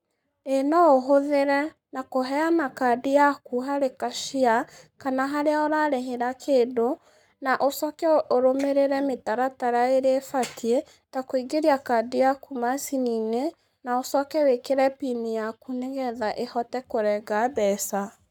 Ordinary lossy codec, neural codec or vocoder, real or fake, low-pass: none; codec, 44.1 kHz, 7.8 kbps, Pupu-Codec; fake; 19.8 kHz